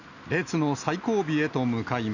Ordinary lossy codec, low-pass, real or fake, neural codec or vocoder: none; 7.2 kHz; real; none